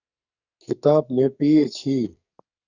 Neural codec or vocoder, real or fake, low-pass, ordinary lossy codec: codec, 44.1 kHz, 2.6 kbps, SNAC; fake; 7.2 kHz; Opus, 64 kbps